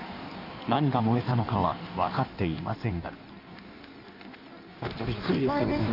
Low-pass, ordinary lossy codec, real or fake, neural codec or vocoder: 5.4 kHz; none; fake; codec, 16 kHz in and 24 kHz out, 1.1 kbps, FireRedTTS-2 codec